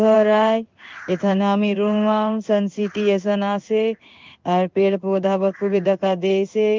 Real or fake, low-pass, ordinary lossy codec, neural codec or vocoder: fake; 7.2 kHz; Opus, 32 kbps; codec, 16 kHz in and 24 kHz out, 1 kbps, XY-Tokenizer